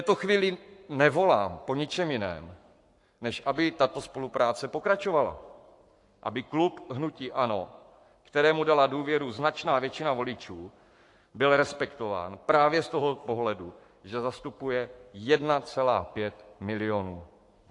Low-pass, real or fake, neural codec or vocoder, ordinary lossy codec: 10.8 kHz; fake; codec, 44.1 kHz, 7.8 kbps, Pupu-Codec; AAC, 64 kbps